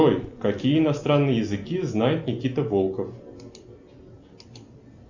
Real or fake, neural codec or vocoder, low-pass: real; none; 7.2 kHz